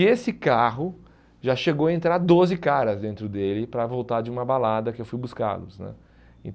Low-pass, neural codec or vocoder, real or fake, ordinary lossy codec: none; none; real; none